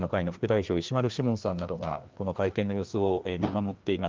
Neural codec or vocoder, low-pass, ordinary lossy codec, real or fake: codec, 16 kHz, 2 kbps, FreqCodec, larger model; 7.2 kHz; Opus, 32 kbps; fake